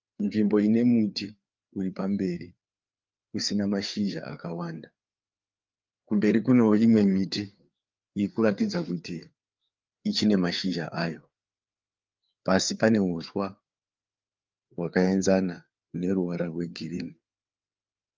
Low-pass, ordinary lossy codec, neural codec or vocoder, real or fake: 7.2 kHz; Opus, 24 kbps; codec, 16 kHz, 4 kbps, FreqCodec, larger model; fake